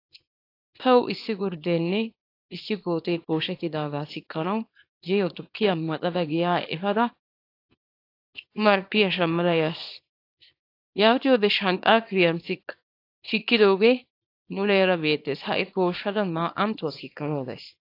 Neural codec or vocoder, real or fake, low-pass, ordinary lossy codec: codec, 24 kHz, 0.9 kbps, WavTokenizer, small release; fake; 5.4 kHz; AAC, 32 kbps